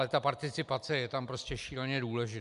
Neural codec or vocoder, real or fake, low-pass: none; real; 10.8 kHz